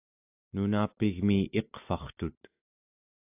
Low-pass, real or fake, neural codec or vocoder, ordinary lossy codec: 3.6 kHz; real; none; AAC, 32 kbps